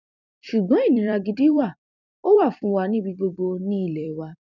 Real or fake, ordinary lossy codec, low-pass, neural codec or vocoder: fake; none; 7.2 kHz; vocoder, 44.1 kHz, 128 mel bands every 256 samples, BigVGAN v2